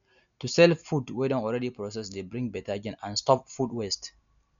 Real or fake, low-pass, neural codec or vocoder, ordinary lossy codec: real; 7.2 kHz; none; none